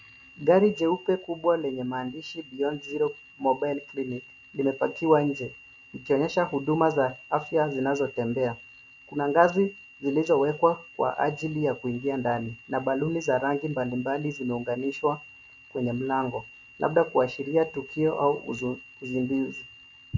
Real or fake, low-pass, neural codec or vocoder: real; 7.2 kHz; none